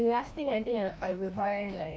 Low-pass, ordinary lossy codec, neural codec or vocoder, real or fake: none; none; codec, 16 kHz, 1 kbps, FreqCodec, larger model; fake